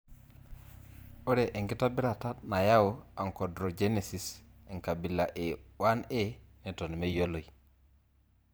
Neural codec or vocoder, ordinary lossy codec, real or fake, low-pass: vocoder, 44.1 kHz, 128 mel bands every 512 samples, BigVGAN v2; none; fake; none